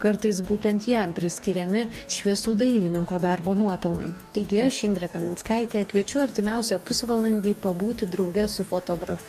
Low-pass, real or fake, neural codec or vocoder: 14.4 kHz; fake; codec, 44.1 kHz, 2.6 kbps, DAC